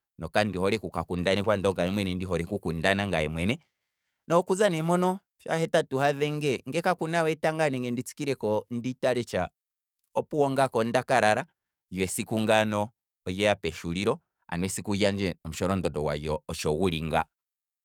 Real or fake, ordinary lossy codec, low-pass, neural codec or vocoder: fake; none; 19.8 kHz; codec, 44.1 kHz, 7.8 kbps, DAC